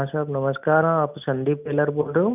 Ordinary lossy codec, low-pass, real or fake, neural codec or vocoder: none; 3.6 kHz; real; none